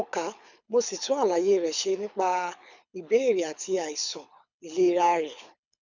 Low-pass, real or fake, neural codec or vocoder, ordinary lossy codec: 7.2 kHz; fake; codec, 24 kHz, 6 kbps, HILCodec; none